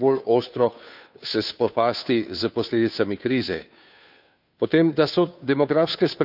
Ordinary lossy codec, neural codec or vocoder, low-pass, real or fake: none; codec, 16 kHz, 2 kbps, FunCodec, trained on Chinese and English, 25 frames a second; 5.4 kHz; fake